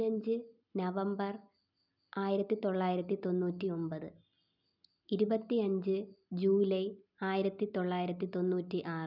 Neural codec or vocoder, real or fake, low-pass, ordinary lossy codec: none; real; 5.4 kHz; none